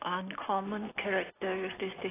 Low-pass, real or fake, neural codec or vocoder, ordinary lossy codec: 3.6 kHz; real; none; AAC, 16 kbps